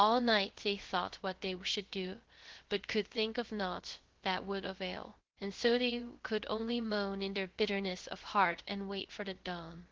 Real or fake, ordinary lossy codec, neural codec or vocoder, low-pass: fake; Opus, 16 kbps; codec, 16 kHz, 0.3 kbps, FocalCodec; 7.2 kHz